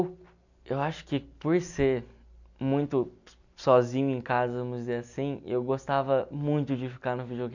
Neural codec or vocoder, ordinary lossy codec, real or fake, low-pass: none; none; real; 7.2 kHz